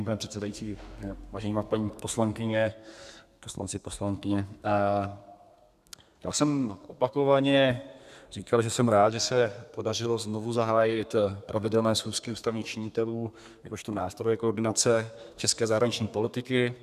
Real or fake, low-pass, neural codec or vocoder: fake; 14.4 kHz; codec, 32 kHz, 1.9 kbps, SNAC